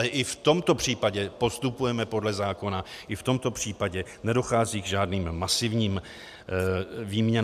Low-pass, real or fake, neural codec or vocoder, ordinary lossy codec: 14.4 kHz; real; none; MP3, 96 kbps